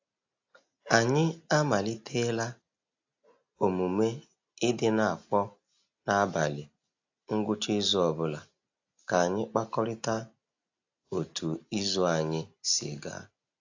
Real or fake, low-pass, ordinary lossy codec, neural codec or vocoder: real; 7.2 kHz; AAC, 48 kbps; none